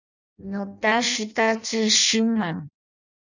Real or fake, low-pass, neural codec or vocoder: fake; 7.2 kHz; codec, 16 kHz in and 24 kHz out, 0.6 kbps, FireRedTTS-2 codec